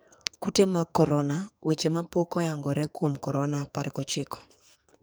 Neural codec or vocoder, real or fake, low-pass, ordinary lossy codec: codec, 44.1 kHz, 2.6 kbps, SNAC; fake; none; none